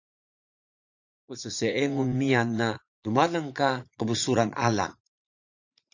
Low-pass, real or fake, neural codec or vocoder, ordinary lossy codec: 7.2 kHz; fake; vocoder, 44.1 kHz, 80 mel bands, Vocos; AAC, 48 kbps